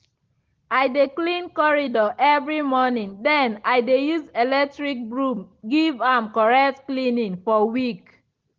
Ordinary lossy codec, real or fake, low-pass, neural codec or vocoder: Opus, 16 kbps; real; 7.2 kHz; none